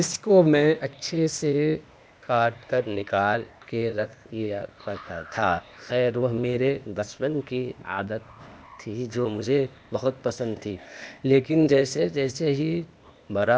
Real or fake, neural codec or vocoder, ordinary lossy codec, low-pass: fake; codec, 16 kHz, 0.8 kbps, ZipCodec; none; none